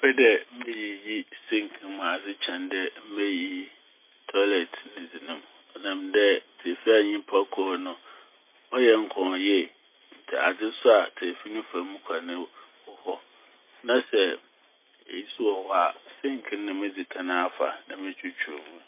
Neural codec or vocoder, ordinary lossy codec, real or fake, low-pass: none; MP3, 24 kbps; real; 3.6 kHz